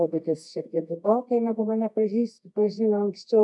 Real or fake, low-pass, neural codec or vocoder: fake; 10.8 kHz; codec, 24 kHz, 0.9 kbps, WavTokenizer, medium music audio release